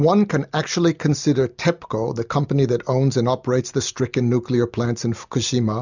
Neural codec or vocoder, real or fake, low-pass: none; real; 7.2 kHz